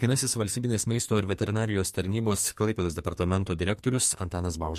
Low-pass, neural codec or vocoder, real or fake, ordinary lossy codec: 14.4 kHz; codec, 44.1 kHz, 2.6 kbps, SNAC; fake; MP3, 64 kbps